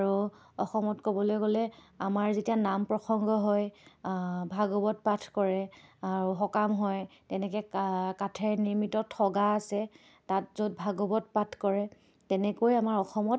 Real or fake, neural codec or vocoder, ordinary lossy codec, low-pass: real; none; none; none